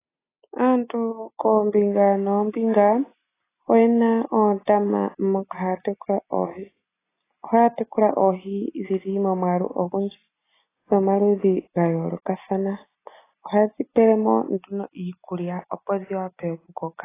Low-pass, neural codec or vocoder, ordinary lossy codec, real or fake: 3.6 kHz; none; AAC, 16 kbps; real